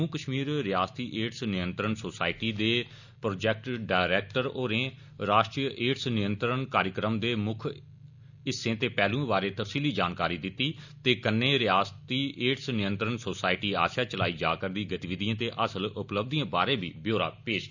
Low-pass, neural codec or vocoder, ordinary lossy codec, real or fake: 7.2 kHz; none; none; real